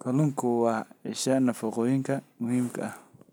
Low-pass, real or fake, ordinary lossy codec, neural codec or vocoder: none; real; none; none